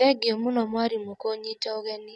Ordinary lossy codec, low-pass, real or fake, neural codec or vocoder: none; none; real; none